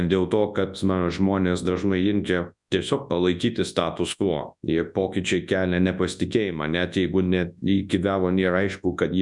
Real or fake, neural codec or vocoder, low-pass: fake; codec, 24 kHz, 0.9 kbps, WavTokenizer, large speech release; 10.8 kHz